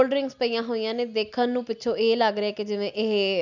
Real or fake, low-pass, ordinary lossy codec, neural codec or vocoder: real; 7.2 kHz; none; none